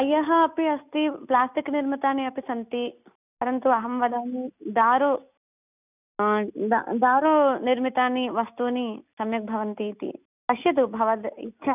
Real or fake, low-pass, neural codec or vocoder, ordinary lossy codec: real; 3.6 kHz; none; none